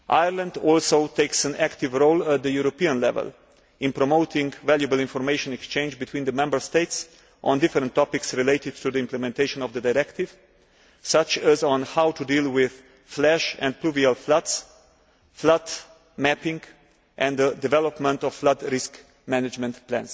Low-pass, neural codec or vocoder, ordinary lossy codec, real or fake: none; none; none; real